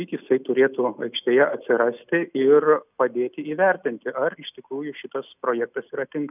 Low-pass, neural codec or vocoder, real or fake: 3.6 kHz; none; real